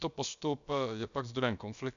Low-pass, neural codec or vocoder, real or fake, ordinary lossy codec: 7.2 kHz; codec, 16 kHz, about 1 kbps, DyCAST, with the encoder's durations; fake; MP3, 96 kbps